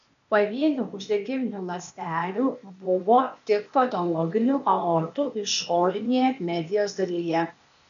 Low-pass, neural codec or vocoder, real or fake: 7.2 kHz; codec, 16 kHz, 0.8 kbps, ZipCodec; fake